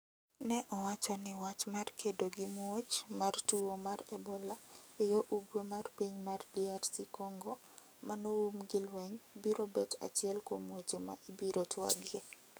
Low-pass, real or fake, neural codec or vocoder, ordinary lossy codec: none; fake; codec, 44.1 kHz, 7.8 kbps, DAC; none